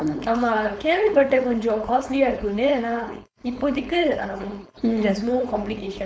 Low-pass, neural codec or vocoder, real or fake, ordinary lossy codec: none; codec, 16 kHz, 4.8 kbps, FACodec; fake; none